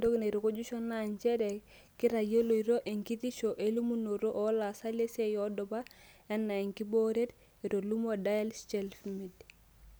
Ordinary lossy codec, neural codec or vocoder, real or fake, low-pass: none; none; real; none